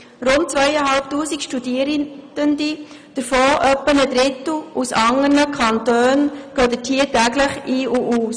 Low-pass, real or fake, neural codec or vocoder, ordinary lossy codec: 9.9 kHz; real; none; none